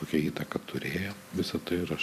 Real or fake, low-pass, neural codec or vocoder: fake; 14.4 kHz; vocoder, 44.1 kHz, 128 mel bands every 256 samples, BigVGAN v2